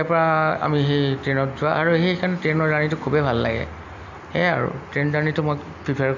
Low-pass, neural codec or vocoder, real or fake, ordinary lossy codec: 7.2 kHz; none; real; none